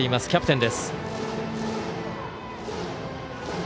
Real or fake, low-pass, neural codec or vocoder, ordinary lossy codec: real; none; none; none